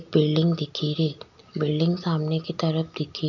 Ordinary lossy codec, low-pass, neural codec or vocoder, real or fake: none; 7.2 kHz; none; real